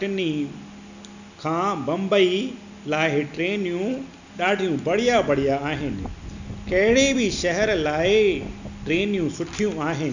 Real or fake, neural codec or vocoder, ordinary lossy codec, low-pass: real; none; none; 7.2 kHz